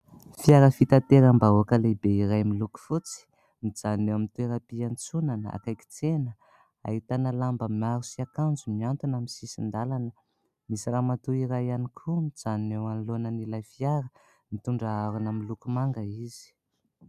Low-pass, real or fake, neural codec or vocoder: 14.4 kHz; real; none